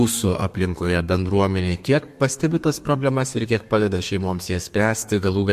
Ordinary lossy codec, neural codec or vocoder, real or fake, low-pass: MP3, 64 kbps; codec, 32 kHz, 1.9 kbps, SNAC; fake; 14.4 kHz